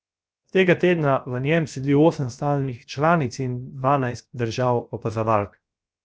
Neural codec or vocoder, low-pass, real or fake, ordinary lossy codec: codec, 16 kHz, 0.7 kbps, FocalCodec; none; fake; none